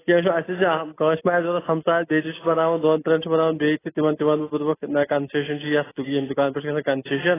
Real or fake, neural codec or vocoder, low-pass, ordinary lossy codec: real; none; 3.6 kHz; AAC, 16 kbps